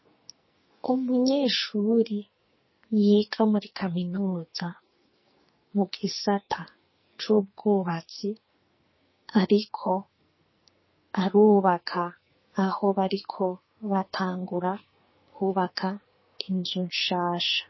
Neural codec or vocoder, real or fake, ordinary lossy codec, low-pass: codec, 44.1 kHz, 2.6 kbps, SNAC; fake; MP3, 24 kbps; 7.2 kHz